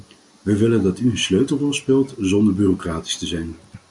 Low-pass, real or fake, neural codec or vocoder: 10.8 kHz; real; none